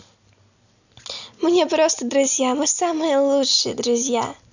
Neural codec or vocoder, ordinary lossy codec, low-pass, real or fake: none; none; 7.2 kHz; real